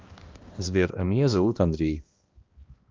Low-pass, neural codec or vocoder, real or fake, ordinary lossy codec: 7.2 kHz; codec, 16 kHz, 1 kbps, X-Codec, HuBERT features, trained on balanced general audio; fake; Opus, 32 kbps